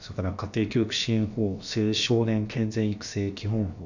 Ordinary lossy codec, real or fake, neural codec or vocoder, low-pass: none; fake; codec, 16 kHz, about 1 kbps, DyCAST, with the encoder's durations; 7.2 kHz